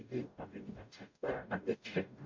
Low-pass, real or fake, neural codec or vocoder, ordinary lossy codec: 7.2 kHz; fake; codec, 44.1 kHz, 0.9 kbps, DAC; MP3, 48 kbps